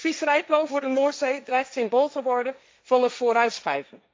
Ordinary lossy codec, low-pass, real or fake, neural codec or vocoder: none; none; fake; codec, 16 kHz, 1.1 kbps, Voila-Tokenizer